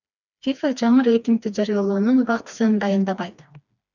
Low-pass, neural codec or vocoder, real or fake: 7.2 kHz; codec, 16 kHz, 2 kbps, FreqCodec, smaller model; fake